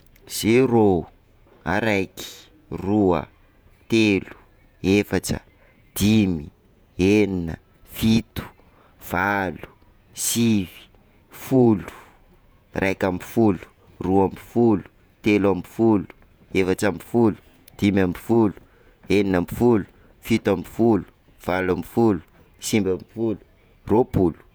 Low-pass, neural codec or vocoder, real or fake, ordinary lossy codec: none; vocoder, 48 kHz, 128 mel bands, Vocos; fake; none